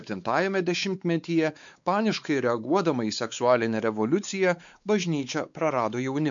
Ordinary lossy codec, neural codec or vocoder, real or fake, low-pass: MP3, 64 kbps; codec, 16 kHz, 4 kbps, X-Codec, WavLM features, trained on Multilingual LibriSpeech; fake; 7.2 kHz